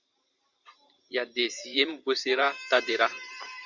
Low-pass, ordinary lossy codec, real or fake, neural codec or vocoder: 7.2 kHz; Opus, 64 kbps; fake; vocoder, 44.1 kHz, 128 mel bands every 512 samples, BigVGAN v2